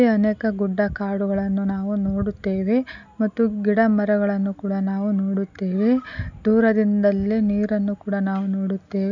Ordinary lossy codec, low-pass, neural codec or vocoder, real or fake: none; 7.2 kHz; none; real